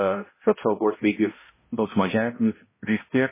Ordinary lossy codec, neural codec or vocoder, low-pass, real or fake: MP3, 16 kbps; codec, 16 kHz, 0.5 kbps, X-Codec, HuBERT features, trained on balanced general audio; 3.6 kHz; fake